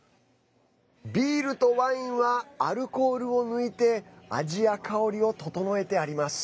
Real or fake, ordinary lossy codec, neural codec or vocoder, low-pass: real; none; none; none